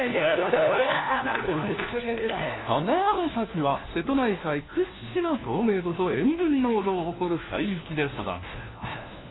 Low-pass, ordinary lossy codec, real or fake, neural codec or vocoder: 7.2 kHz; AAC, 16 kbps; fake; codec, 16 kHz, 1 kbps, FunCodec, trained on LibriTTS, 50 frames a second